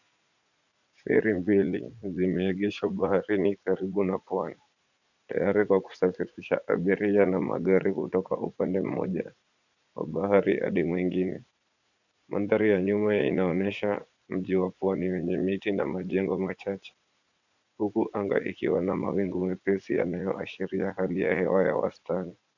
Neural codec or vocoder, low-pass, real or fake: vocoder, 44.1 kHz, 80 mel bands, Vocos; 7.2 kHz; fake